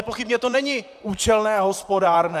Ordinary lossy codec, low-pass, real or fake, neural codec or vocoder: AAC, 96 kbps; 14.4 kHz; fake; vocoder, 44.1 kHz, 128 mel bands, Pupu-Vocoder